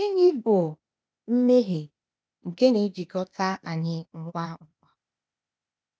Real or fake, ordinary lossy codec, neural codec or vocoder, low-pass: fake; none; codec, 16 kHz, 0.8 kbps, ZipCodec; none